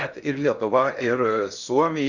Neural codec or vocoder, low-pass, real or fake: codec, 16 kHz in and 24 kHz out, 0.6 kbps, FocalCodec, streaming, 2048 codes; 7.2 kHz; fake